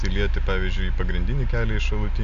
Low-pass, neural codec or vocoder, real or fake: 7.2 kHz; none; real